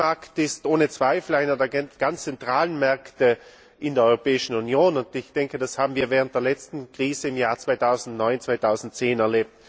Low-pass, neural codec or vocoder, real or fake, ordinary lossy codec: none; none; real; none